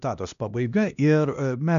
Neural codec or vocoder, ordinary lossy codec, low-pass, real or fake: codec, 16 kHz, 1 kbps, X-Codec, HuBERT features, trained on LibriSpeech; MP3, 96 kbps; 7.2 kHz; fake